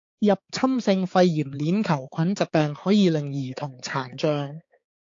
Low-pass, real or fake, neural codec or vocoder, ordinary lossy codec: 7.2 kHz; fake; codec, 16 kHz, 4 kbps, X-Codec, HuBERT features, trained on balanced general audio; AAC, 48 kbps